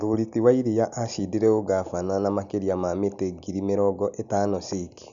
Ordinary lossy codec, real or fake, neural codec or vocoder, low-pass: none; real; none; 7.2 kHz